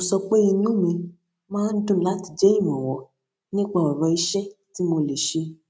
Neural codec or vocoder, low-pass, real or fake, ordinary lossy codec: none; none; real; none